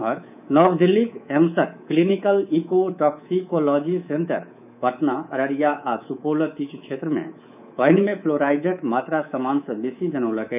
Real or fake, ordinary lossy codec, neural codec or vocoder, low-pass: fake; none; codec, 24 kHz, 3.1 kbps, DualCodec; 3.6 kHz